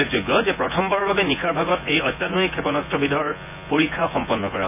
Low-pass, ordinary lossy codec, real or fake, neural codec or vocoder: 3.6 kHz; none; fake; vocoder, 24 kHz, 100 mel bands, Vocos